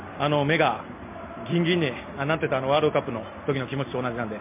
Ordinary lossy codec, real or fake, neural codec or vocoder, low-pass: MP3, 32 kbps; real; none; 3.6 kHz